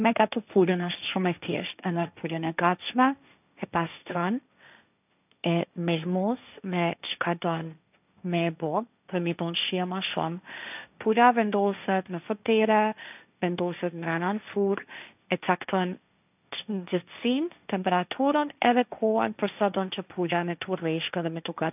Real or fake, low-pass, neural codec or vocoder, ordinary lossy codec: fake; 3.6 kHz; codec, 16 kHz, 1.1 kbps, Voila-Tokenizer; none